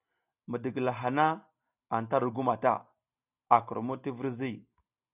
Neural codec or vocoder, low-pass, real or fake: none; 3.6 kHz; real